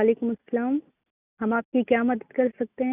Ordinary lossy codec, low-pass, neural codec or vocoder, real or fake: none; 3.6 kHz; none; real